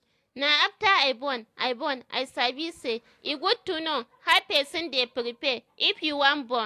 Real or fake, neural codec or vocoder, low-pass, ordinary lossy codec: real; none; 14.4 kHz; AAC, 64 kbps